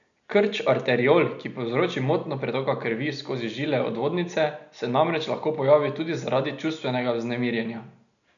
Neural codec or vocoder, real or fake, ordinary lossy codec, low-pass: none; real; none; 7.2 kHz